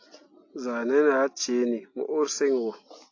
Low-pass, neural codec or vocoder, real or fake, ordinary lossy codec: 7.2 kHz; none; real; AAC, 48 kbps